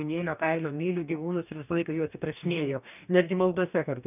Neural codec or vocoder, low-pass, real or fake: codec, 44.1 kHz, 2.6 kbps, DAC; 3.6 kHz; fake